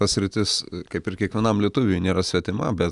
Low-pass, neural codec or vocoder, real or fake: 10.8 kHz; none; real